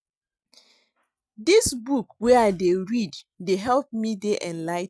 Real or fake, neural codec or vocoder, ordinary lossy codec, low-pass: real; none; none; none